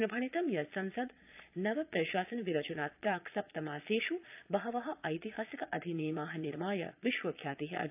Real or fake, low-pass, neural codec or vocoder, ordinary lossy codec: fake; 3.6 kHz; vocoder, 22.05 kHz, 80 mel bands, Vocos; AAC, 32 kbps